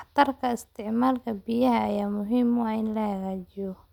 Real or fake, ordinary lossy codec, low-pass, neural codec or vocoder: real; none; 19.8 kHz; none